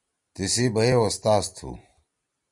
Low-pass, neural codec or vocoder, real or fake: 10.8 kHz; none; real